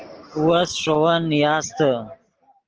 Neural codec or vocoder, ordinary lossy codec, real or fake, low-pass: none; Opus, 16 kbps; real; 7.2 kHz